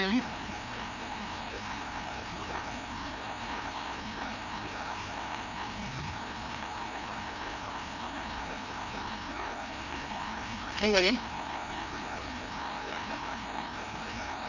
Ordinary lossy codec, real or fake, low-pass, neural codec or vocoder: none; fake; 7.2 kHz; codec, 16 kHz, 1 kbps, FreqCodec, larger model